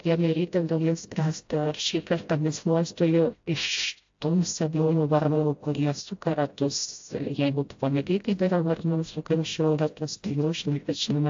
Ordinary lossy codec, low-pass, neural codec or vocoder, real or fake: AAC, 48 kbps; 7.2 kHz; codec, 16 kHz, 0.5 kbps, FreqCodec, smaller model; fake